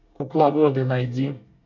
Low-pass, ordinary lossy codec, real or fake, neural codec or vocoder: 7.2 kHz; MP3, 64 kbps; fake; codec, 24 kHz, 1 kbps, SNAC